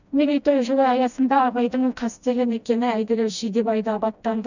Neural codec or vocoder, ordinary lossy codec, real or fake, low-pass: codec, 16 kHz, 1 kbps, FreqCodec, smaller model; none; fake; 7.2 kHz